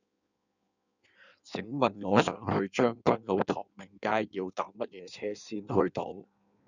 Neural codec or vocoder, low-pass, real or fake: codec, 16 kHz in and 24 kHz out, 1.1 kbps, FireRedTTS-2 codec; 7.2 kHz; fake